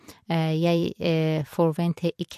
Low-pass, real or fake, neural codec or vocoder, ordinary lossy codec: 14.4 kHz; fake; vocoder, 44.1 kHz, 128 mel bands every 256 samples, BigVGAN v2; MP3, 64 kbps